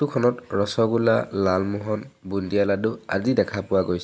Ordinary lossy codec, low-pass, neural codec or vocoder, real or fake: none; none; none; real